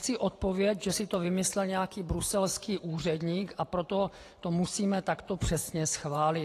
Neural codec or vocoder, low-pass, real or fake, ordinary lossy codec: vocoder, 44.1 kHz, 128 mel bands every 512 samples, BigVGAN v2; 14.4 kHz; fake; AAC, 48 kbps